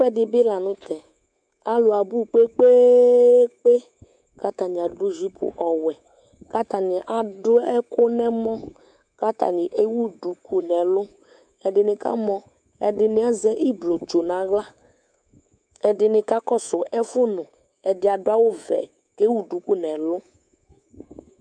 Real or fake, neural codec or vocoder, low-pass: fake; codec, 24 kHz, 3.1 kbps, DualCodec; 9.9 kHz